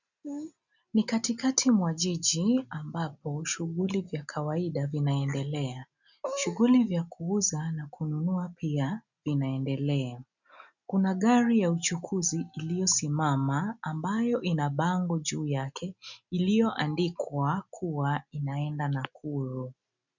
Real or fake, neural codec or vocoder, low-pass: real; none; 7.2 kHz